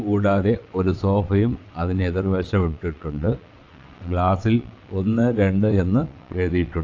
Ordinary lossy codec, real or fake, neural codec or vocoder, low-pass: AAC, 48 kbps; fake; vocoder, 22.05 kHz, 80 mel bands, WaveNeXt; 7.2 kHz